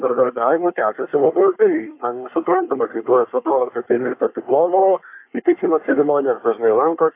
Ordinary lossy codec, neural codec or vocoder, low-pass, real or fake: AAC, 24 kbps; codec, 24 kHz, 1 kbps, SNAC; 3.6 kHz; fake